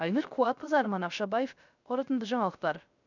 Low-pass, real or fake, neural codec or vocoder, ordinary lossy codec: 7.2 kHz; fake; codec, 16 kHz, 0.3 kbps, FocalCodec; none